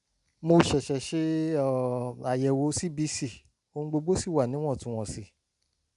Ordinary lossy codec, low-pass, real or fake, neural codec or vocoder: none; 10.8 kHz; real; none